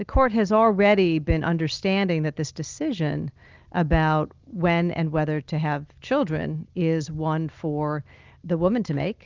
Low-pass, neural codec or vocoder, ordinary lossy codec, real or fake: 7.2 kHz; none; Opus, 24 kbps; real